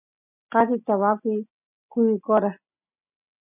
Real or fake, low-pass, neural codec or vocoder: real; 3.6 kHz; none